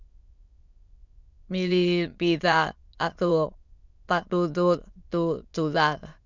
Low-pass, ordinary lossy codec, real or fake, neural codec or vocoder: 7.2 kHz; Opus, 64 kbps; fake; autoencoder, 22.05 kHz, a latent of 192 numbers a frame, VITS, trained on many speakers